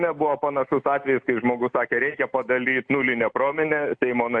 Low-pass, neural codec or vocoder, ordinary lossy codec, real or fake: 9.9 kHz; none; MP3, 64 kbps; real